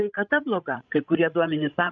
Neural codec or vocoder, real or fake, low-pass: codec, 16 kHz, 16 kbps, FreqCodec, larger model; fake; 7.2 kHz